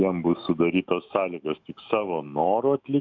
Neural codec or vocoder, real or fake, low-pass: autoencoder, 48 kHz, 128 numbers a frame, DAC-VAE, trained on Japanese speech; fake; 7.2 kHz